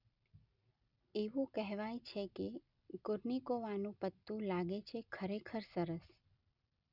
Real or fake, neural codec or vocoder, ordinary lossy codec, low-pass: real; none; Opus, 64 kbps; 5.4 kHz